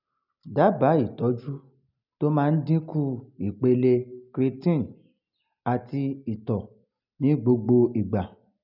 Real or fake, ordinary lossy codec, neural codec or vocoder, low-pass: real; none; none; 5.4 kHz